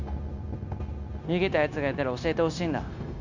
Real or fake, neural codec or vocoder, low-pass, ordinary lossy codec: fake; codec, 16 kHz, 0.9 kbps, LongCat-Audio-Codec; 7.2 kHz; none